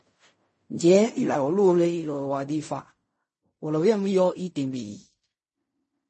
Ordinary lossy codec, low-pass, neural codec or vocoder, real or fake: MP3, 32 kbps; 10.8 kHz; codec, 16 kHz in and 24 kHz out, 0.4 kbps, LongCat-Audio-Codec, fine tuned four codebook decoder; fake